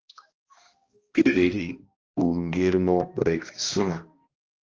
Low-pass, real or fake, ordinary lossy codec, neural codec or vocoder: 7.2 kHz; fake; Opus, 24 kbps; codec, 16 kHz, 1 kbps, X-Codec, HuBERT features, trained on balanced general audio